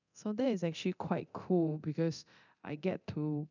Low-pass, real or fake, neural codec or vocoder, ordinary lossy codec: 7.2 kHz; fake; codec, 24 kHz, 0.9 kbps, DualCodec; none